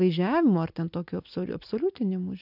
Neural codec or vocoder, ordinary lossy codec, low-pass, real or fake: none; AAC, 48 kbps; 5.4 kHz; real